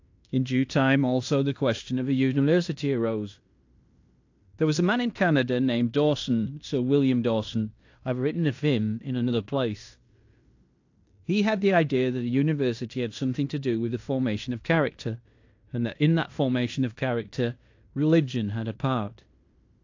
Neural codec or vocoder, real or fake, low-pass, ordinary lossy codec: codec, 16 kHz in and 24 kHz out, 0.9 kbps, LongCat-Audio-Codec, fine tuned four codebook decoder; fake; 7.2 kHz; AAC, 48 kbps